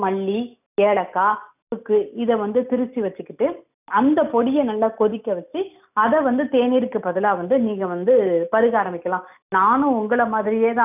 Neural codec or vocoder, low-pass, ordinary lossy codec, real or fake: none; 3.6 kHz; none; real